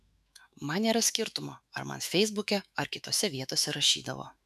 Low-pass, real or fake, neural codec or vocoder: 14.4 kHz; fake; autoencoder, 48 kHz, 128 numbers a frame, DAC-VAE, trained on Japanese speech